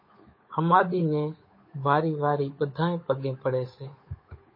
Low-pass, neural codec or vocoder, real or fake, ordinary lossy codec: 5.4 kHz; codec, 16 kHz, 8 kbps, FunCodec, trained on Chinese and English, 25 frames a second; fake; MP3, 24 kbps